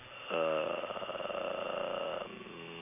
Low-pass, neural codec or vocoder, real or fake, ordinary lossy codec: 3.6 kHz; none; real; none